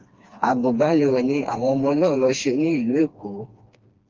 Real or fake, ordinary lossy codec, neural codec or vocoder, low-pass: fake; Opus, 32 kbps; codec, 16 kHz, 2 kbps, FreqCodec, smaller model; 7.2 kHz